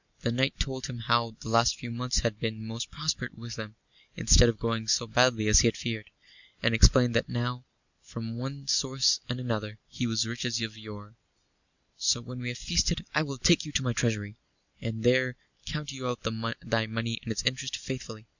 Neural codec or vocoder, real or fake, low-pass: none; real; 7.2 kHz